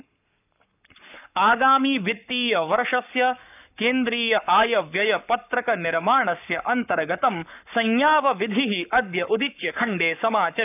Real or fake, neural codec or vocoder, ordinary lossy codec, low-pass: fake; codec, 44.1 kHz, 7.8 kbps, Pupu-Codec; none; 3.6 kHz